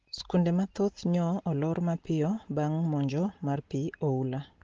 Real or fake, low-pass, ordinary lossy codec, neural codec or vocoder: real; 7.2 kHz; Opus, 16 kbps; none